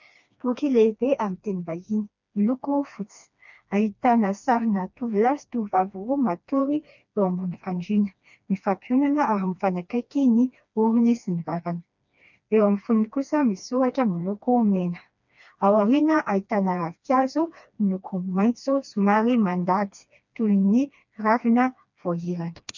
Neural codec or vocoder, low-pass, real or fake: codec, 16 kHz, 2 kbps, FreqCodec, smaller model; 7.2 kHz; fake